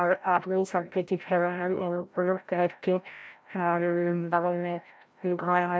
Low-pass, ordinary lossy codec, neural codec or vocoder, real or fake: none; none; codec, 16 kHz, 0.5 kbps, FreqCodec, larger model; fake